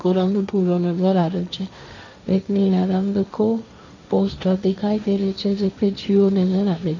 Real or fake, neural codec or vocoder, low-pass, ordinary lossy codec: fake; codec, 16 kHz, 1.1 kbps, Voila-Tokenizer; 7.2 kHz; none